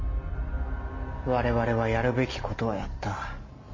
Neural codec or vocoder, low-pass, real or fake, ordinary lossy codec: none; 7.2 kHz; real; MP3, 32 kbps